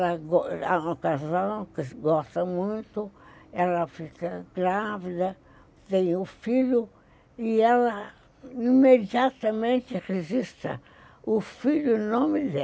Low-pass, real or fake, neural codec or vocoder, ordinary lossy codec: none; real; none; none